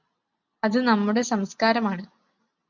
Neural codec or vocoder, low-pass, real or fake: none; 7.2 kHz; real